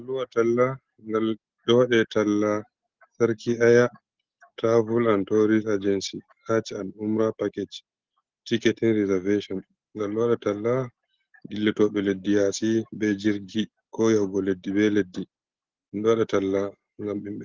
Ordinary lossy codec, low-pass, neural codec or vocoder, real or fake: Opus, 16 kbps; 7.2 kHz; none; real